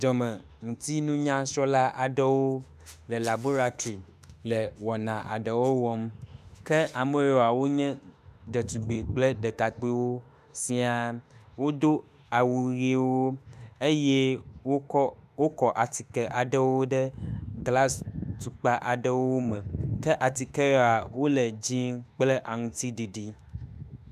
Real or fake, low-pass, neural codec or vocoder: fake; 14.4 kHz; autoencoder, 48 kHz, 32 numbers a frame, DAC-VAE, trained on Japanese speech